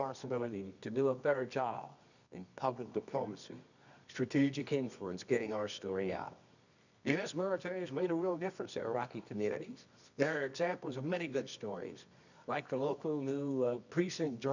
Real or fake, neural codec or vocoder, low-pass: fake; codec, 24 kHz, 0.9 kbps, WavTokenizer, medium music audio release; 7.2 kHz